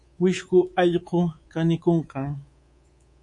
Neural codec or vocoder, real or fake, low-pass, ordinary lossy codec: codec, 24 kHz, 3.1 kbps, DualCodec; fake; 10.8 kHz; MP3, 48 kbps